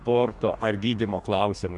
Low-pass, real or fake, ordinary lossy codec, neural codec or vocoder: 10.8 kHz; fake; MP3, 96 kbps; codec, 44.1 kHz, 2.6 kbps, DAC